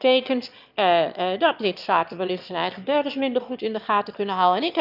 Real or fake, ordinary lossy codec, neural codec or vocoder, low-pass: fake; none; autoencoder, 22.05 kHz, a latent of 192 numbers a frame, VITS, trained on one speaker; 5.4 kHz